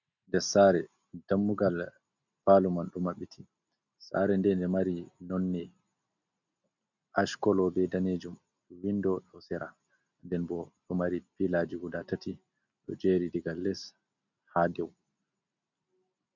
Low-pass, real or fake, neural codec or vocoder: 7.2 kHz; real; none